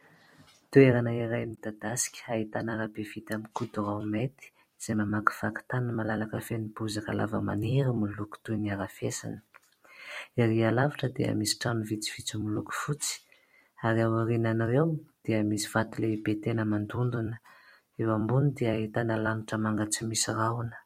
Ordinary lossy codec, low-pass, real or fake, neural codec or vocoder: MP3, 64 kbps; 19.8 kHz; fake; vocoder, 44.1 kHz, 128 mel bands every 256 samples, BigVGAN v2